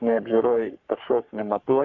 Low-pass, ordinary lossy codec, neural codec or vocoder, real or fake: 7.2 kHz; MP3, 64 kbps; codec, 44.1 kHz, 3.4 kbps, Pupu-Codec; fake